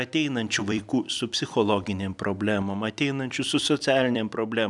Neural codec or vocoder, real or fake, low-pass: vocoder, 44.1 kHz, 128 mel bands every 256 samples, BigVGAN v2; fake; 10.8 kHz